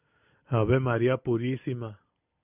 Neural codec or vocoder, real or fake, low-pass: none; real; 3.6 kHz